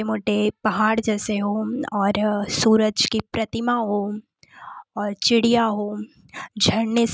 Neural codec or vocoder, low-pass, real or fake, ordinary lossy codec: none; none; real; none